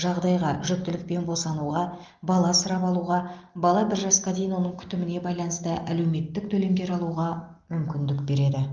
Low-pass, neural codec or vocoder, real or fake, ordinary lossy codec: 7.2 kHz; none; real; Opus, 32 kbps